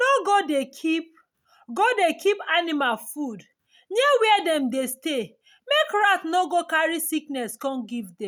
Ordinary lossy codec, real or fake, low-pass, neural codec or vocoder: none; real; none; none